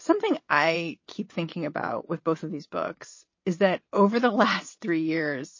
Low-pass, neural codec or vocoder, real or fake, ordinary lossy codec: 7.2 kHz; vocoder, 22.05 kHz, 80 mel bands, WaveNeXt; fake; MP3, 32 kbps